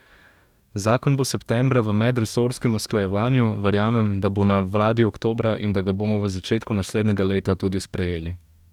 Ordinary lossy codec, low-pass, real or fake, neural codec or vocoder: none; 19.8 kHz; fake; codec, 44.1 kHz, 2.6 kbps, DAC